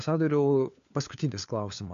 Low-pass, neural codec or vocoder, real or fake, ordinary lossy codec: 7.2 kHz; codec, 16 kHz, 4 kbps, FunCodec, trained on LibriTTS, 50 frames a second; fake; MP3, 64 kbps